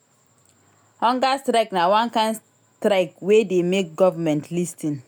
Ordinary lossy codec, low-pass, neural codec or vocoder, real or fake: none; none; none; real